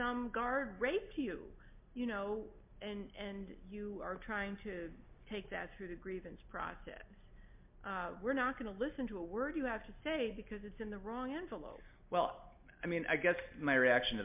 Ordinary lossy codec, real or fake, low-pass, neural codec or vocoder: MP3, 32 kbps; real; 3.6 kHz; none